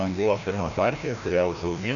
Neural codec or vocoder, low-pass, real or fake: codec, 16 kHz, 1 kbps, FreqCodec, larger model; 7.2 kHz; fake